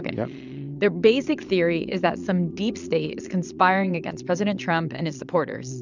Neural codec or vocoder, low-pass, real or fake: vocoder, 22.05 kHz, 80 mel bands, Vocos; 7.2 kHz; fake